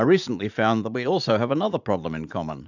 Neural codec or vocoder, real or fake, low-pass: vocoder, 44.1 kHz, 80 mel bands, Vocos; fake; 7.2 kHz